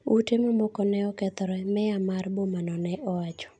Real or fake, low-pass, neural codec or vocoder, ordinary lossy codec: real; 9.9 kHz; none; none